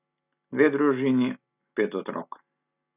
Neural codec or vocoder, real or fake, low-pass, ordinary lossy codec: none; real; 3.6 kHz; none